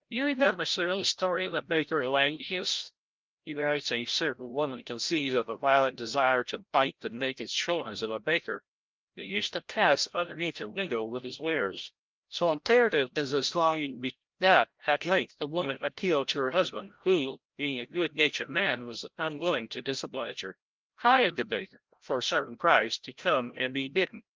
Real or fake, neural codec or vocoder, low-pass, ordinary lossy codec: fake; codec, 16 kHz, 0.5 kbps, FreqCodec, larger model; 7.2 kHz; Opus, 32 kbps